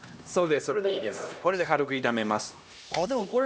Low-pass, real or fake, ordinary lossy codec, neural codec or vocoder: none; fake; none; codec, 16 kHz, 1 kbps, X-Codec, HuBERT features, trained on LibriSpeech